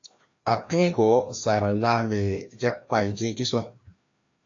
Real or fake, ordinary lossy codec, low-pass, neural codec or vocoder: fake; AAC, 48 kbps; 7.2 kHz; codec, 16 kHz, 1 kbps, FreqCodec, larger model